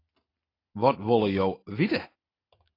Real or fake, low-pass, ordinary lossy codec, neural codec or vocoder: real; 5.4 kHz; AAC, 24 kbps; none